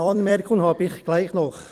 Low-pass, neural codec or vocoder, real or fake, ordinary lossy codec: 14.4 kHz; vocoder, 44.1 kHz, 128 mel bands every 256 samples, BigVGAN v2; fake; Opus, 24 kbps